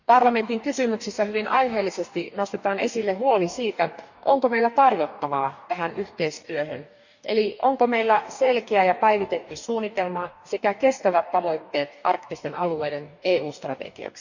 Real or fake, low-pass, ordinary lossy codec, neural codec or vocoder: fake; 7.2 kHz; none; codec, 44.1 kHz, 2.6 kbps, DAC